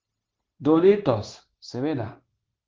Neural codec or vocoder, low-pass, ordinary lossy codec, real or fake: codec, 16 kHz, 0.9 kbps, LongCat-Audio-Codec; 7.2 kHz; Opus, 16 kbps; fake